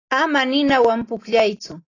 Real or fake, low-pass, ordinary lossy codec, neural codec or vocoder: real; 7.2 kHz; AAC, 32 kbps; none